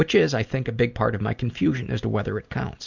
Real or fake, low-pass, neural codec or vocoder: real; 7.2 kHz; none